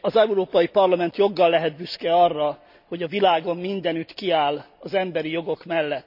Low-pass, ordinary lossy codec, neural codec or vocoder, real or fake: 5.4 kHz; none; none; real